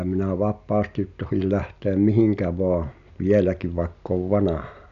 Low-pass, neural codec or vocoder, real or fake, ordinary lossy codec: 7.2 kHz; none; real; none